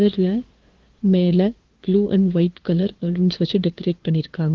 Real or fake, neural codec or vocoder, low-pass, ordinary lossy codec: fake; codec, 16 kHz, 2 kbps, FunCodec, trained on LibriTTS, 25 frames a second; 7.2 kHz; Opus, 16 kbps